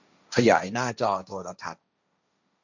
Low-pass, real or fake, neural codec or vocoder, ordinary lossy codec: 7.2 kHz; fake; codec, 16 kHz, 1.1 kbps, Voila-Tokenizer; none